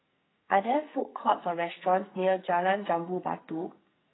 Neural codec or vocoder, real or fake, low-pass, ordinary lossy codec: codec, 44.1 kHz, 2.6 kbps, SNAC; fake; 7.2 kHz; AAC, 16 kbps